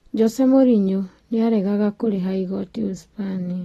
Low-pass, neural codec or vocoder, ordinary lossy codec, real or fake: 19.8 kHz; none; AAC, 32 kbps; real